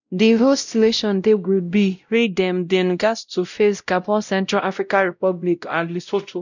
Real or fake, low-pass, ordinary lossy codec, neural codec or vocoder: fake; 7.2 kHz; none; codec, 16 kHz, 0.5 kbps, X-Codec, WavLM features, trained on Multilingual LibriSpeech